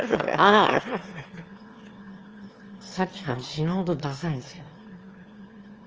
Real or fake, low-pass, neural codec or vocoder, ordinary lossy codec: fake; 7.2 kHz; autoencoder, 22.05 kHz, a latent of 192 numbers a frame, VITS, trained on one speaker; Opus, 24 kbps